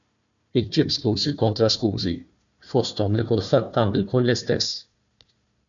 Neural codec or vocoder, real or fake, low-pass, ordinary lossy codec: codec, 16 kHz, 1 kbps, FunCodec, trained on Chinese and English, 50 frames a second; fake; 7.2 kHz; MP3, 64 kbps